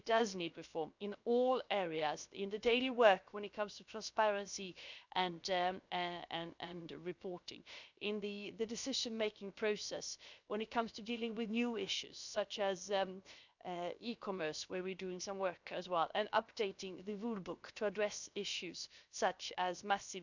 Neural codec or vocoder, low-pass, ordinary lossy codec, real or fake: codec, 16 kHz, 0.7 kbps, FocalCodec; 7.2 kHz; none; fake